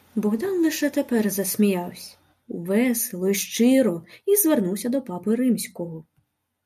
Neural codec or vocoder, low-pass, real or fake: none; 14.4 kHz; real